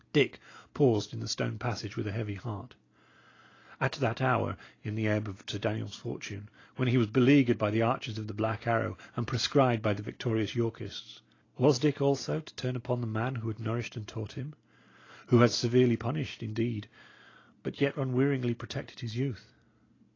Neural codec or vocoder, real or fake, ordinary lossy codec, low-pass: none; real; AAC, 32 kbps; 7.2 kHz